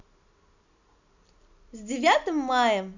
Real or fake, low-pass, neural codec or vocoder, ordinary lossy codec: real; 7.2 kHz; none; none